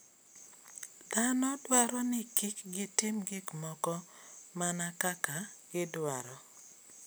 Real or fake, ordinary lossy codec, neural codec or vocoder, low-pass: real; none; none; none